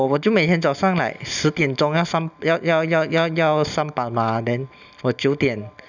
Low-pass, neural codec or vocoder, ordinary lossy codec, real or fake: 7.2 kHz; none; none; real